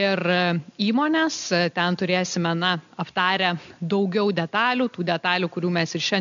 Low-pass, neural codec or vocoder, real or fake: 7.2 kHz; none; real